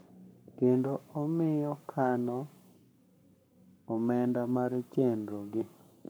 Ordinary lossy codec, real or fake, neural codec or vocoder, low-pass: none; fake; codec, 44.1 kHz, 7.8 kbps, Pupu-Codec; none